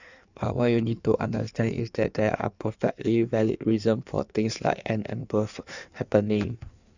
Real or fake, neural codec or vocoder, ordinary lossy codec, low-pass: fake; codec, 16 kHz in and 24 kHz out, 1.1 kbps, FireRedTTS-2 codec; none; 7.2 kHz